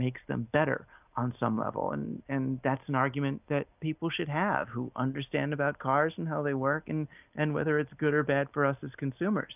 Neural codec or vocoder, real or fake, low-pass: none; real; 3.6 kHz